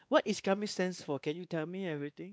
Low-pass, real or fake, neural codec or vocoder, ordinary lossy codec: none; fake; codec, 16 kHz, 2 kbps, X-Codec, WavLM features, trained on Multilingual LibriSpeech; none